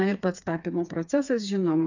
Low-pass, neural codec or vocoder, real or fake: 7.2 kHz; codec, 16 kHz, 4 kbps, FreqCodec, smaller model; fake